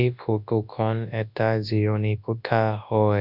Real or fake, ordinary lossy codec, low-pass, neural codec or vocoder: fake; none; 5.4 kHz; codec, 24 kHz, 0.9 kbps, WavTokenizer, large speech release